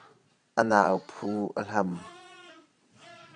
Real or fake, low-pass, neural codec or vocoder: fake; 9.9 kHz; vocoder, 22.05 kHz, 80 mel bands, Vocos